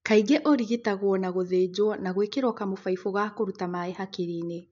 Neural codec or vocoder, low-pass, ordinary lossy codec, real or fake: none; 7.2 kHz; MP3, 64 kbps; real